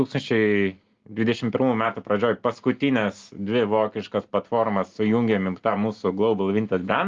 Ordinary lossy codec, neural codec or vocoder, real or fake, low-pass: Opus, 32 kbps; none; real; 7.2 kHz